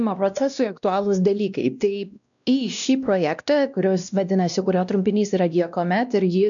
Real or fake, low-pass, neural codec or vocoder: fake; 7.2 kHz; codec, 16 kHz, 1 kbps, X-Codec, WavLM features, trained on Multilingual LibriSpeech